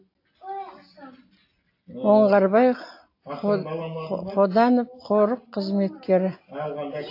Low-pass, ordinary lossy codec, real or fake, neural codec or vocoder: 5.4 kHz; AAC, 32 kbps; real; none